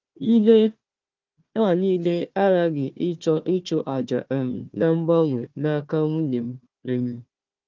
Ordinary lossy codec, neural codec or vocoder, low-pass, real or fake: Opus, 32 kbps; codec, 16 kHz, 1 kbps, FunCodec, trained on Chinese and English, 50 frames a second; 7.2 kHz; fake